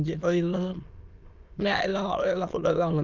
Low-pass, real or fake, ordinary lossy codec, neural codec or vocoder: 7.2 kHz; fake; Opus, 24 kbps; autoencoder, 22.05 kHz, a latent of 192 numbers a frame, VITS, trained on many speakers